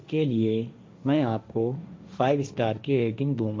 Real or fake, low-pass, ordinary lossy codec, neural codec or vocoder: fake; none; none; codec, 16 kHz, 1.1 kbps, Voila-Tokenizer